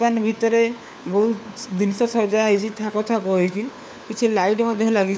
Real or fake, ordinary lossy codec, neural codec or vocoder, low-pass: fake; none; codec, 16 kHz, 4 kbps, FunCodec, trained on LibriTTS, 50 frames a second; none